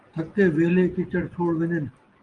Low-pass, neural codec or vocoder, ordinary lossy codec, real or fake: 9.9 kHz; none; Opus, 24 kbps; real